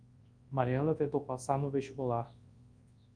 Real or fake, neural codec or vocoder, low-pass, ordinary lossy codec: fake; codec, 24 kHz, 0.9 kbps, WavTokenizer, large speech release; 9.9 kHz; Opus, 32 kbps